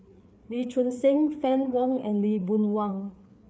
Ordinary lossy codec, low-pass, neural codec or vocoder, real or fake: none; none; codec, 16 kHz, 4 kbps, FreqCodec, larger model; fake